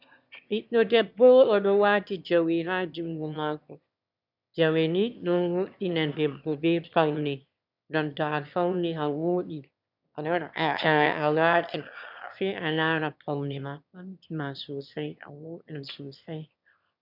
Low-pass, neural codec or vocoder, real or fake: 5.4 kHz; autoencoder, 22.05 kHz, a latent of 192 numbers a frame, VITS, trained on one speaker; fake